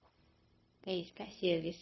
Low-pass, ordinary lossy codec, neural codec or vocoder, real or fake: 7.2 kHz; MP3, 24 kbps; codec, 16 kHz, 0.4 kbps, LongCat-Audio-Codec; fake